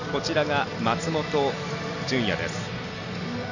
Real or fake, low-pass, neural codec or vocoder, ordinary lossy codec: real; 7.2 kHz; none; none